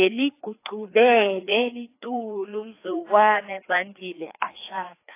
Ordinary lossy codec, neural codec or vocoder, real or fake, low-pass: AAC, 24 kbps; codec, 16 kHz, 2 kbps, FreqCodec, larger model; fake; 3.6 kHz